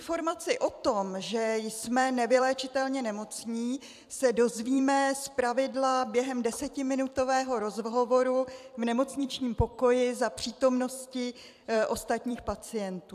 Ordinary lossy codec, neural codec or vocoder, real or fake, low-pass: AAC, 96 kbps; vocoder, 44.1 kHz, 128 mel bands every 256 samples, BigVGAN v2; fake; 14.4 kHz